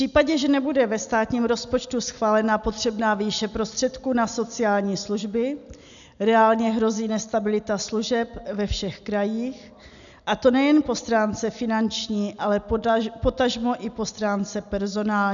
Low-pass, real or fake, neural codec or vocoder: 7.2 kHz; real; none